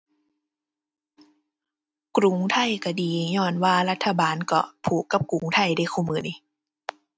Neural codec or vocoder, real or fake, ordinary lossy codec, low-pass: none; real; none; none